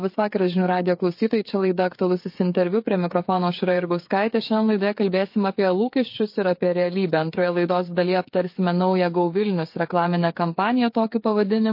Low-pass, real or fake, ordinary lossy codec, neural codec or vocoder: 5.4 kHz; fake; MP3, 32 kbps; codec, 16 kHz, 8 kbps, FreqCodec, smaller model